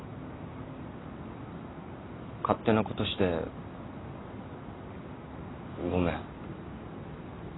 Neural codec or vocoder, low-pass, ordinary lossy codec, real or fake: none; 7.2 kHz; AAC, 16 kbps; real